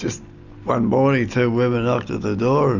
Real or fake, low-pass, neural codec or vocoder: real; 7.2 kHz; none